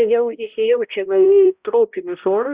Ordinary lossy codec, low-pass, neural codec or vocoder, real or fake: Opus, 64 kbps; 3.6 kHz; codec, 16 kHz, 0.5 kbps, X-Codec, HuBERT features, trained on balanced general audio; fake